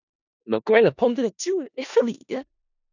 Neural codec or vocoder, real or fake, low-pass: codec, 16 kHz in and 24 kHz out, 0.4 kbps, LongCat-Audio-Codec, four codebook decoder; fake; 7.2 kHz